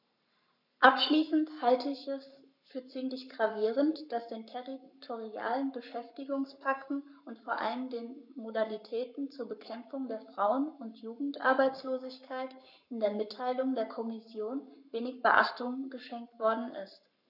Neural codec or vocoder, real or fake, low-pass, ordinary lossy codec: codec, 44.1 kHz, 7.8 kbps, Pupu-Codec; fake; 5.4 kHz; none